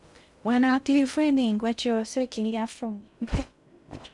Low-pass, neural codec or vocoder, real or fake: 10.8 kHz; codec, 16 kHz in and 24 kHz out, 0.6 kbps, FocalCodec, streaming, 2048 codes; fake